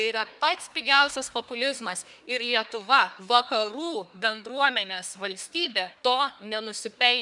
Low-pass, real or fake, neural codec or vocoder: 10.8 kHz; fake; codec, 24 kHz, 1 kbps, SNAC